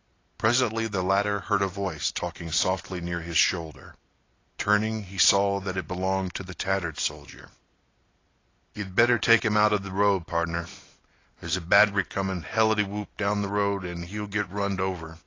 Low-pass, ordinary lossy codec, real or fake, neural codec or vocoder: 7.2 kHz; AAC, 32 kbps; real; none